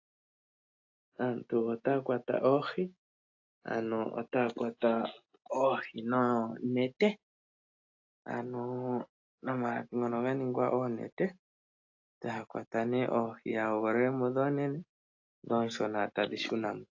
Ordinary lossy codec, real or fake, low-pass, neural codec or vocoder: AAC, 48 kbps; real; 7.2 kHz; none